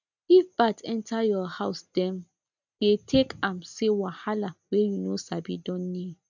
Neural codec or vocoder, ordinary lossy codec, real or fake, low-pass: none; none; real; 7.2 kHz